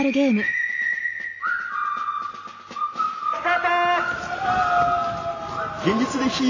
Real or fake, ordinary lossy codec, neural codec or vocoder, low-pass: real; AAC, 32 kbps; none; 7.2 kHz